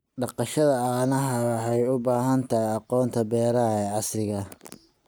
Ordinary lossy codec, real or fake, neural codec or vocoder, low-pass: none; real; none; none